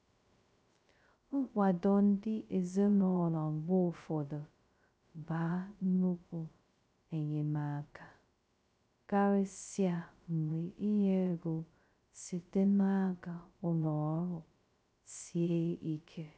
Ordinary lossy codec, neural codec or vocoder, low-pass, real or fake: none; codec, 16 kHz, 0.2 kbps, FocalCodec; none; fake